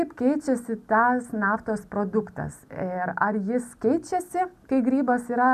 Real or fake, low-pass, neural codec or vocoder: real; 14.4 kHz; none